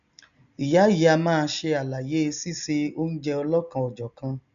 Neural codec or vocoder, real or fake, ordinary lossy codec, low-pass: none; real; AAC, 96 kbps; 7.2 kHz